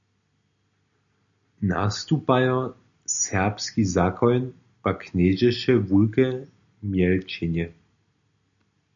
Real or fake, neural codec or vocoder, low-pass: real; none; 7.2 kHz